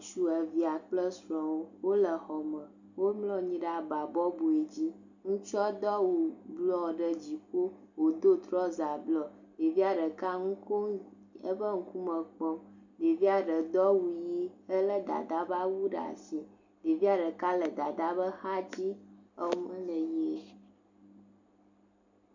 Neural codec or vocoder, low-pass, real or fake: none; 7.2 kHz; real